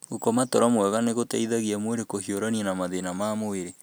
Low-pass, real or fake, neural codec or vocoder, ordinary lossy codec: none; real; none; none